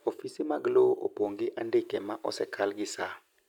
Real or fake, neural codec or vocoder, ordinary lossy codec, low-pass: real; none; none; 19.8 kHz